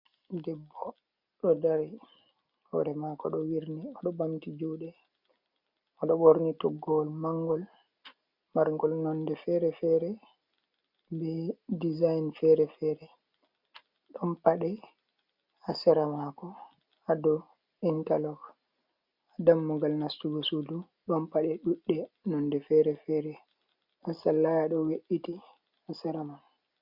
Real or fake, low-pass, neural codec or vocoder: real; 5.4 kHz; none